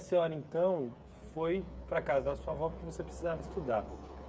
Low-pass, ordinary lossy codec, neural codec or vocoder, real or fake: none; none; codec, 16 kHz, 8 kbps, FreqCodec, smaller model; fake